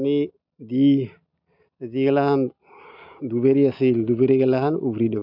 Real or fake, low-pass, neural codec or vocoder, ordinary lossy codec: real; 5.4 kHz; none; none